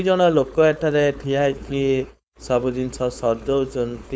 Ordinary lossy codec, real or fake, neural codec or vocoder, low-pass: none; fake; codec, 16 kHz, 4.8 kbps, FACodec; none